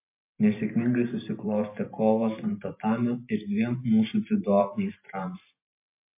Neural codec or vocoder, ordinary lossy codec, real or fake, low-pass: vocoder, 44.1 kHz, 128 mel bands every 512 samples, BigVGAN v2; MP3, 24 kbps; fake; 3.6 kHz